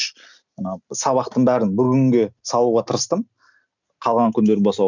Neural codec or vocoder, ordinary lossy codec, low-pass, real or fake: none; none; 7.2 kHz; real